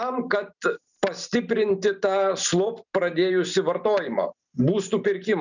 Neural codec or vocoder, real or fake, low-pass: none; real; 7.2 kHz